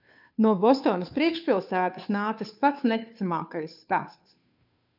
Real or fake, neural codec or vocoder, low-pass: fake; codec, 16 kHz, 2 kbps, FunCodec, trained on Chinese and English, 25 frames a second; 5.4 kHz